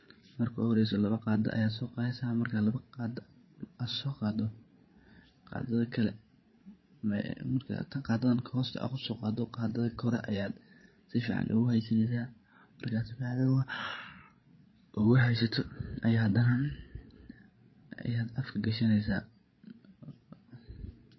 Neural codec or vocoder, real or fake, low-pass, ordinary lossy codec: codec, 16 kHz, 16 kbps, FreqCodec, larger model; fake; 7.2 kHz; MP3, 24 kbps